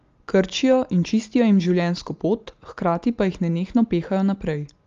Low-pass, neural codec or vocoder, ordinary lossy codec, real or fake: 7.2 kHz; none; Opus, 32 kbps; real